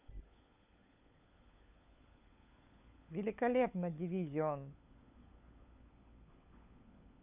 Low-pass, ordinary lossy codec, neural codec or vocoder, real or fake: 3.6 kHz; none; codec, 16 kHz, 16 kbps, FunCodec, trained on LibriTTS, 50 frames a second; fake